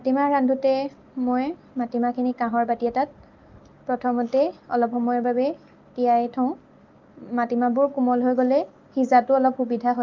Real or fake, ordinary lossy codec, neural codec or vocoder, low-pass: real; Opus, 32 kbps; none; 7.2 kHz